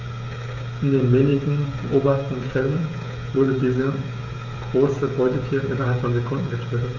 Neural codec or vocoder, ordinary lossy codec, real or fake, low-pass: codec, 16 kHz, 16 kbps, FreqCodec, smaller model; none; fake; 7.2 kHz